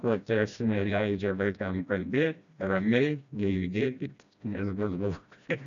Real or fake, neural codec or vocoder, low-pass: fake; codec, 16 kHz, 1 kbps, FreqCodec, smaller model; 7.2 kHz